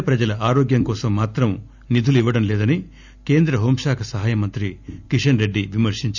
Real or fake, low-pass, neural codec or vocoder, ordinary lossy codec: real; 7.2 kHz; none; none